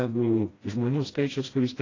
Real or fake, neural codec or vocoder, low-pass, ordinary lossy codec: fake; codec, 16 kHz, 1 kbps, FreqCodec, smaller model; 7.2 kHz; AAC, 32 kbps